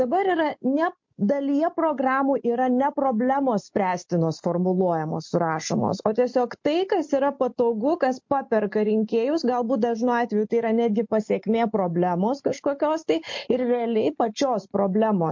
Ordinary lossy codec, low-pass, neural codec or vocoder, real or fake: MP3, 48 kbps; 7.2 kHz; none; real